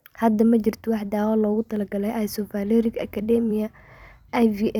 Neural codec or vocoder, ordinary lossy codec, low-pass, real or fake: vocoder, 44.1 kHz, 128 mel bands every 256 samples, BigVGAN v2; none; 19.8 kHz; fake